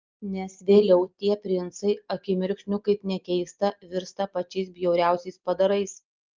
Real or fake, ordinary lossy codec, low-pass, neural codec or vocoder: real; Opus, 32 kbps; 7.2 kHz; none